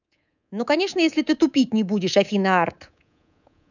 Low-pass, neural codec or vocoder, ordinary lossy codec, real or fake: 7.2 kHz; codec, 24 kHz, 3.1 kbps, DualCodec; none; fake